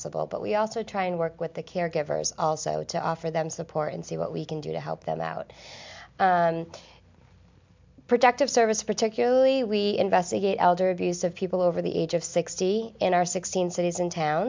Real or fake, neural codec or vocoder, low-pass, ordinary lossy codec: real; none; 7.2 kHz; MP3, 64 kbps